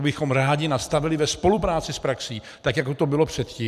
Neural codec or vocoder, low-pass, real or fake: vocoder, 44.1 kHz, 128 mel bands every 256 samples, BigVGAN v2; 14.4 kHz; fake